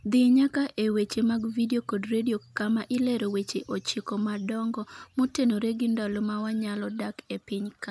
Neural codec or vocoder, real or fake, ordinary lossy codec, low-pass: none; real; none; none